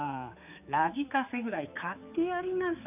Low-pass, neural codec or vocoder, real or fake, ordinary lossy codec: 3.6 kHz; codec, 16 kHz, 4 kbps, X-Codec, HuBERT features, trained on general audio; fake; none